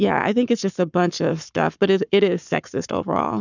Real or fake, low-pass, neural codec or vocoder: fake; 7.2 kHz; codec, 44.1 kHz, 7.8 kbps, Pupu-Codec